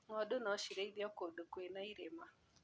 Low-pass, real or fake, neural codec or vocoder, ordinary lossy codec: none; real; none; none